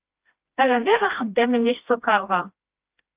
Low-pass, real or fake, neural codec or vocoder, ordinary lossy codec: 3.6 kHz; fake; codec, 16 kHz, 1 kbps, FreqCodec, smaller model; Opus, 24 kbps